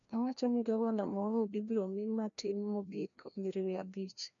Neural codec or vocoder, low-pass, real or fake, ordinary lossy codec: codec, 16 kHz, 1 kbps, FreqCodec, larger model; 7.2 kHz; fake; none